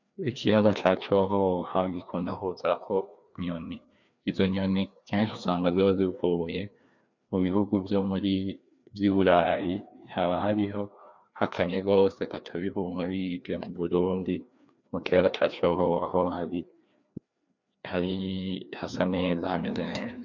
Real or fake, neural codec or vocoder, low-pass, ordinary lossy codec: fake; codec, 16 kHz, 2 kbps, FreqCodec, larger model; 7.2 kHz; MP3, 64 kbps